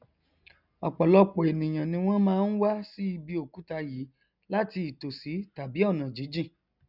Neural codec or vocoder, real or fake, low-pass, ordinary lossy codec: none; real; 5.4 kHz; none